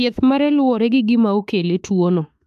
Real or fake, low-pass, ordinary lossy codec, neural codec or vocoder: fake; 14.4 kHz; AAC, 96 kbps; autoencoder, 48 kHz, 32 numbers a frame, DAC-VAE, trained on Japanese speech